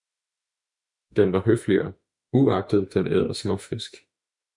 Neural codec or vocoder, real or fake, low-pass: autoencoder, 48 kHz, 32 numbers a frame, DAC-VAE, trained on Japanese speech; fake; 10.8 kHz